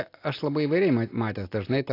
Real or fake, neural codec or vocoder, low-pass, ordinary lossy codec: real; none; 5.4 kHz; AAC, 32 kbps